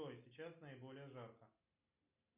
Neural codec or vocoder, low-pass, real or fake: none; 3.6 kHz; real